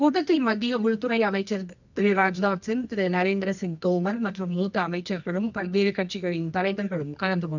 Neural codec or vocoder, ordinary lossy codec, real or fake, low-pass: codec, 24 kHz, 0.9 kbps, WavTokenizer, medium music audio release; none; fake; 7.2 kHz